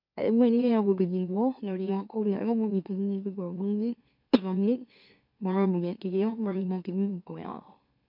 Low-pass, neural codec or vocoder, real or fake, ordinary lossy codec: 5.4 kHz; autoencoder, 44.1 kHz, a latent of 192 numbers a frame, MeloTTS; fake; none